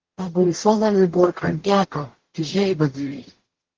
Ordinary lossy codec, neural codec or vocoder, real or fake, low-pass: Opus, 16 kbps; codec, 44.1 kHz, 0.9 kbps, DAC; fake; 7.2 kHz